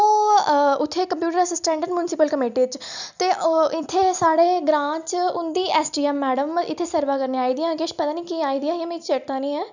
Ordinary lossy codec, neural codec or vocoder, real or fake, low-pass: none; none; real; 7.2 kHz